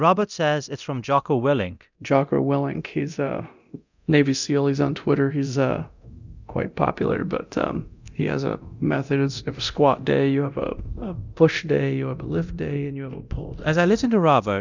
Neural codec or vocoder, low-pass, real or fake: codec, 24 kHz, 0.9 kbps, DualCodec; 7.2 kHz; fake